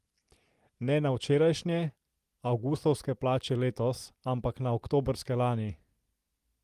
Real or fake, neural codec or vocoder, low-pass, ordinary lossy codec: real; none; 14.4 kHz; Opus, 24 kbps